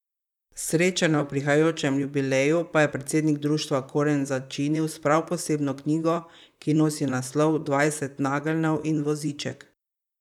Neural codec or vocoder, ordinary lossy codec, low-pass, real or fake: vocoder, 44.1 kHz, 128 mel bands, Pupu-Vocoder; none; 19.8 kHz; fake